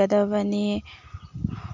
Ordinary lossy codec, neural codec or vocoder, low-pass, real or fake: MP3, 64 kbps; none; 7.2 kHz; real